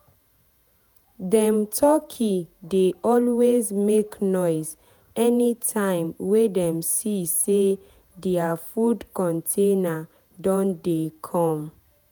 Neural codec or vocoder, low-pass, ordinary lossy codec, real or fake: vocoder, 48 kHz, 128 mel bands, Vocos; none; none; fake